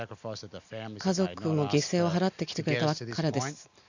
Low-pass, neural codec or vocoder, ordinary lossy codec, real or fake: 7.2 kHz; none; none; real